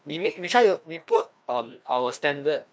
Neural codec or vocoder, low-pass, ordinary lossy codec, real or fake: codec, 16 kHz, 1 kbps, FreqCodec, larger model; none; none; fake